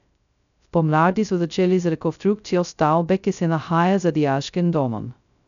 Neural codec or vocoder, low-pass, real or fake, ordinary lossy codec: codec, 16 kHz, 0.2 kbps, FocalCodec; 7.2 kHz; fake; none